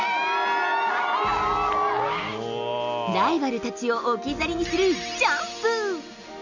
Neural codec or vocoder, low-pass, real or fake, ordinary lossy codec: none; 7.2 kHz; real; none